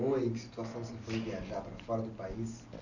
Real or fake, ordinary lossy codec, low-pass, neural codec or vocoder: real; none; 7.2 kHz; none